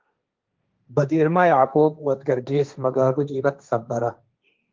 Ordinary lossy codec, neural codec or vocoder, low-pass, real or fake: Opus, 32 kbps; codec, 16 kHz, 1.1 kbps, Voila-Tokenizer; 7.2 kHz; fake